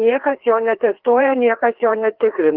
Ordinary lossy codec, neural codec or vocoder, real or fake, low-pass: Opus, 16 kbps; codec, 16 kHz, 2 kbps, FreqCodec, larger model; fake; 5.4 kHz